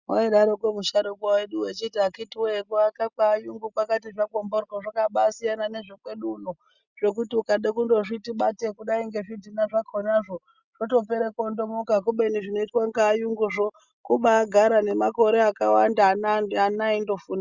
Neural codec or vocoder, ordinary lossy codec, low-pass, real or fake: none; Opus, 64 kbps; 7.2 kHz; real